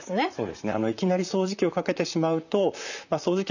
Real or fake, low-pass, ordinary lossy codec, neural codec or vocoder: fake; 7.2 kHz; none; vocoder, 44.1 kHz, 128 mel bands, Pupu-Vocoder